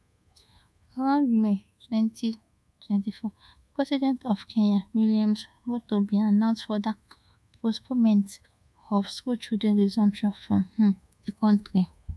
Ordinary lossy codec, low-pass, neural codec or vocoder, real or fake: none; none; codec, 24 kHz, 1.2 kbps, DualCodec; fake